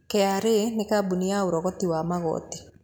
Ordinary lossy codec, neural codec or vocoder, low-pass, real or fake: none; none; none; real